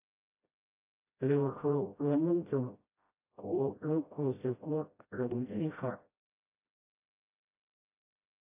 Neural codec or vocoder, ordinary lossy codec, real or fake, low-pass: codec, 16 kHz, 0.5 kbps, FreqCodec, smaller model; AAC, 32 kbps; fake; 3.6 kHz